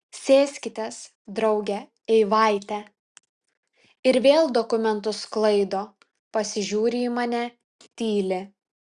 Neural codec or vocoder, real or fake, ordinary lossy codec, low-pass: none; real; Opus, 64 kbps; 9.9 kHz